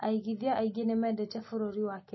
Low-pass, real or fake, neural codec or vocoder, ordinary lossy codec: 7.2 kHz; real; none; MP3, 24 kbps